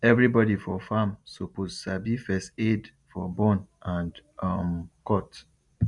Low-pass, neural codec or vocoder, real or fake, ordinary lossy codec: 10.8 kHz; none; real; none